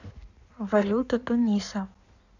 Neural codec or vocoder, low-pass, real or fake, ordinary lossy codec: codec, 16 kHz in and 24 kHz out, 1.1 kbps, FireRedTTS-2 codec; 7.2 kHz; fake; none